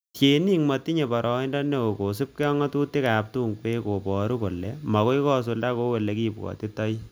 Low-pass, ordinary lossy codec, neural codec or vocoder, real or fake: none; none; none; real